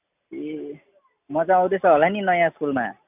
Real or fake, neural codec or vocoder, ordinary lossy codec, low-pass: real; none; none; 3.6 kHz